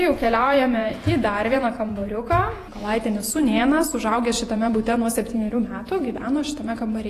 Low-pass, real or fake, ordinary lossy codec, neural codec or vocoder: 14.4 kHz; fake; AAC, 48 kbps; vocoder, 48 kHz, 128 mel bands, Vocos